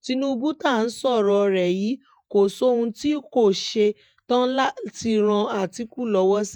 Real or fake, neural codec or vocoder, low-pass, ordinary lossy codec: fake; vocoder, 48 kHz, 128 mel bands, Vocos; 19.8 kHz; none